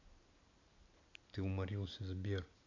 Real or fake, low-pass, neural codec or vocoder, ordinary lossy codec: real; 7.2 kHz; none; MP3, 48 kbps